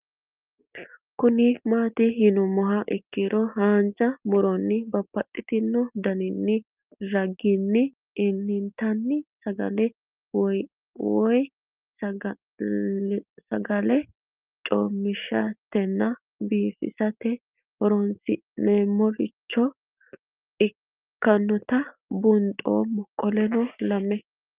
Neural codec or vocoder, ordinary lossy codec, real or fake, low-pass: none; Opus, 32 kbps; real; 3.6 kHz